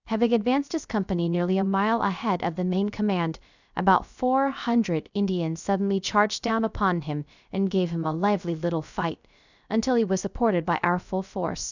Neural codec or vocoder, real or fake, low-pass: codec, 16 kHz, 0.3 kbps, FocalCodec; fake; 7.2 kHz